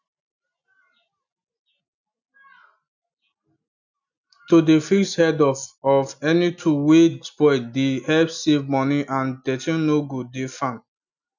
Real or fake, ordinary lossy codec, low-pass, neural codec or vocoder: real; none; 7.2 kHz; none